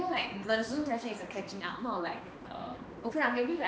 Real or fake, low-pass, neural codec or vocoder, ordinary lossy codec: fake; none; codec, 16 kHz, 2 kbps, X-Codec, HuBERT features, trained on balanced general audio; none